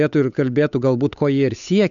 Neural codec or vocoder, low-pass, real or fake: codec, 16 kHz, 8 kbps, FunCodec, trained on Chinese and English, 25 frames a second; 7.2 kHz; fake